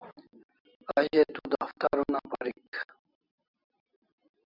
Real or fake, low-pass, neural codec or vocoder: real; 5.4 kHz; none